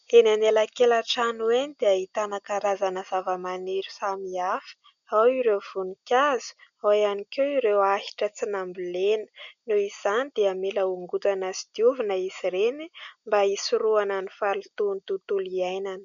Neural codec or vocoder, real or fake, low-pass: none; real; 7.2 kHz